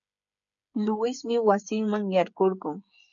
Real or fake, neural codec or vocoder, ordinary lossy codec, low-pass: fake; codec, 16 kHz, 8 kbps, FreqCodec, smaller model; MP3, 96 kbps; 7.2 kHz